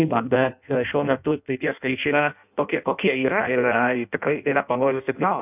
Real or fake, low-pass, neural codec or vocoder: fake; 3.6 kHz; codec, 16 kHz in and 24 kHz out, 0.6 kbps, FireRedTTS-2 codec